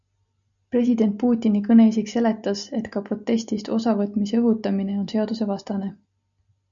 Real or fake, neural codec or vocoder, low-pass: real; none; 7.2 kHz